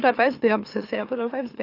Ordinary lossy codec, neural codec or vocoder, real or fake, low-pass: AAC, 24 kbps; autoencoder, 44.1 kHz, a latent of 192 numbers a frame, MeloTTS; fake; 5.4 kHz